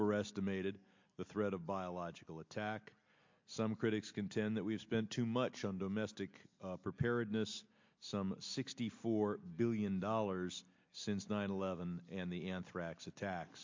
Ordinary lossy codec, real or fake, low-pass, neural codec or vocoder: MP3, 48 kbps; real; 7.2 kHz; none